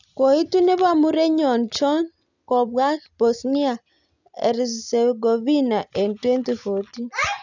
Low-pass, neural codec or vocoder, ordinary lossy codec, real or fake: 7.2 kHz; none; none; real